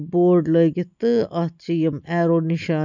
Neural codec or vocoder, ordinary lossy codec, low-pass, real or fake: none; none; 7.2 kHz; real